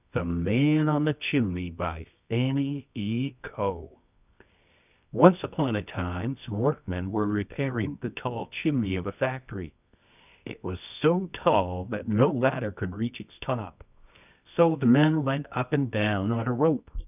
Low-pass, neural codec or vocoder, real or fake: 3.6 kHz; codec, 24 kHz, 0.9 kbps, WavTokenizer, medium music audio release; fake